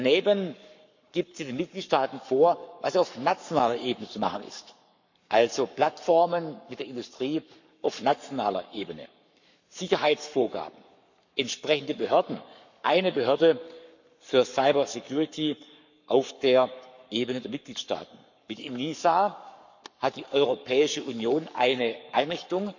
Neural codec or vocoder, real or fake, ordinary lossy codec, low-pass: codec, 44.1 kHz, 7.8 kbps, Pupu-Codec; fake; none; 7.2 kHz